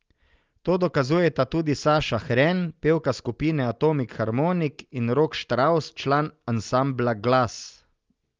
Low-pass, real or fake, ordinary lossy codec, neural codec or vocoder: 7.2 kHz; real; Opus, 24 kbps; none